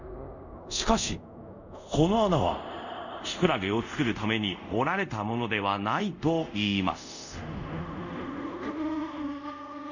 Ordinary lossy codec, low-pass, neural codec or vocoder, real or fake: none; 7.2 kHz; codec, 24 kHz, 0.5 kbps, DualCodec; fake